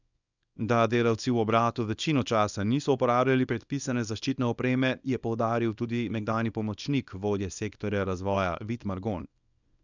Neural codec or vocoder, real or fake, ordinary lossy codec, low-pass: codec, 24 kHz, 0.9 kbps, WavTokenizer, small release; fake; none; 7.2 kHz